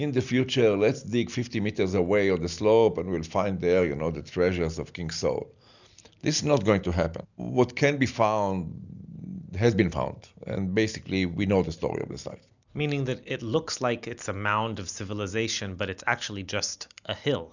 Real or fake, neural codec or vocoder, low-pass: real; none; 7.2 kHz